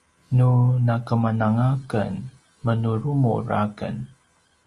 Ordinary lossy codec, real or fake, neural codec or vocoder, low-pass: Opus, 32 kbps; real; none; 10.8 kHz